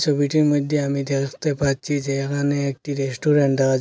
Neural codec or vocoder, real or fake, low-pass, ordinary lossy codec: none; real; none; none